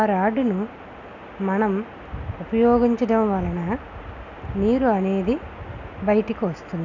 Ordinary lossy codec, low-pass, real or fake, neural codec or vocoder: none; 7.2 kHz; real; none